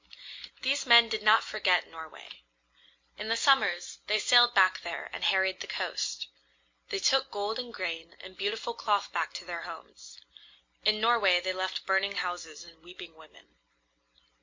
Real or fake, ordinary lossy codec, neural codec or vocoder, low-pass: real; MP3, 48 kbps; none; 7.2 kHz